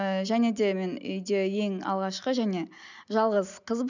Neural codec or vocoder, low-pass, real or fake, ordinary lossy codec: none; 7.2 kHz; real; none